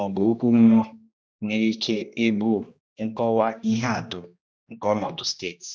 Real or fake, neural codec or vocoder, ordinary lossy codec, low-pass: fake; codec, 16 kHz, 1 kbps, X-Codec, HuBERT features, trained on general audio; none; none